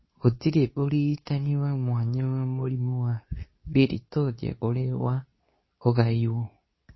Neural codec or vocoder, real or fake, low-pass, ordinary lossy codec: codec, 24 kHz, 0.9 kbps, WavTokenizer, medium speech release version 2; fake; 7.2 kHz; MP3, 24 kbps